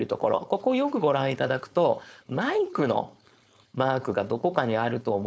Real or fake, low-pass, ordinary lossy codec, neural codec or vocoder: fake; none; none; codec, 16 kHz, 4.8 kbps, FACodec